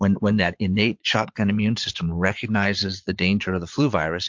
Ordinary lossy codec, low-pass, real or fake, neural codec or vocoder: MP3, 48 kbps; 7.2 kHz; fake; vocoder, 22.05 kHz, 80 mel bands, Vocos